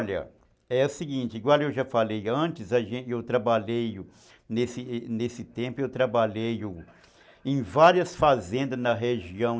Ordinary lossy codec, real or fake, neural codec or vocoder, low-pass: none; real; none; none